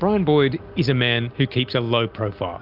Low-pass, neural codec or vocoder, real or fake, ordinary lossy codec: 5.4 kHz; none; real; Opus, 24 kbps